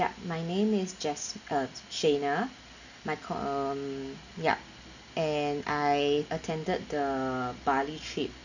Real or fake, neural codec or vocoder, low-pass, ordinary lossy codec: real; none; 7.2 kHz; none